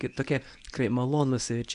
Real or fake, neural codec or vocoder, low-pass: fake; codec, 24 kHz, 0.9 kbps, WavTokenizer, medium speech release version 1; 10.8 kHz